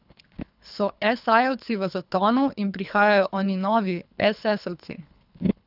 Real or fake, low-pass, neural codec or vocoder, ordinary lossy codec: fake; 5.4 kHz; codec, 24 kHz, 3 kbps, HILCodec; none